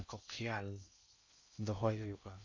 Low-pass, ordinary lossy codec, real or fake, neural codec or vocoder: 7.2 kHz; MP3, 64 kbps; fake; codec, 16 kHz in and 24 kHz out, 0.6 kbps, FocalCodec, streaming, 2048 codes